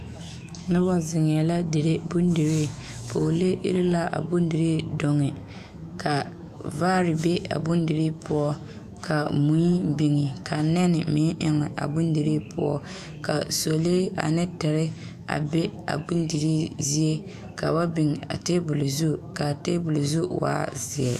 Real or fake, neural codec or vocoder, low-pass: fake; autoencoder, 48 kHz, 128 numbers a frame, DAC-VAE, trained on Japanese speech; 14.4 kHz